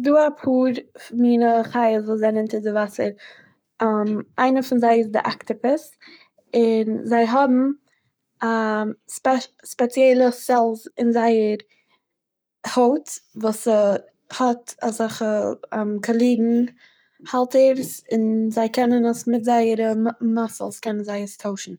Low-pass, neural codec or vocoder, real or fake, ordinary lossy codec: none; codec, 44.1 kHz, 7.8 kbps, Pupu-Codec; fake; none